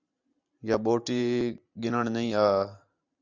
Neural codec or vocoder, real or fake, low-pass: vocoder, 44.1 kHz, 128 mel bands every 512 samples, BigVGAN v2; fake; 7.2 kHz